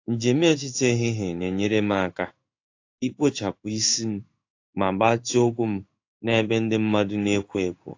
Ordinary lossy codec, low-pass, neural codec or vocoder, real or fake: AAC, 48 kbps; 7.2 kHz; codec, 16 kHz in and 24 kHz out, 1 kbps, XY-Tokenizer; fake